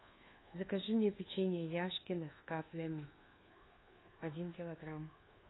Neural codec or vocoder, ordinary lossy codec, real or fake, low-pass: codec, 24 kHz, 1.2 kbps, DualCodec; AAC, 16 kbps; fake; 7.2 kHz